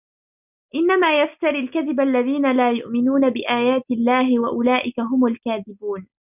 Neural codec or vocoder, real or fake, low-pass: none; real; 3.6 kHz